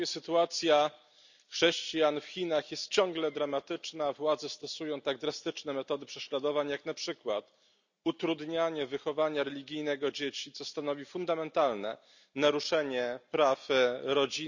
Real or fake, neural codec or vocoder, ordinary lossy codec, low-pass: real; none; none; 7.2 kHz